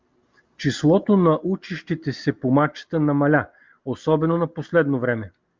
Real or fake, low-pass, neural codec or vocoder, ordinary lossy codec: real; 7.2 kHz; none; Opus, 32 kbps